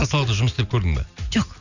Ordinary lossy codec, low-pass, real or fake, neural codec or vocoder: none; 7.2 kHz; real; none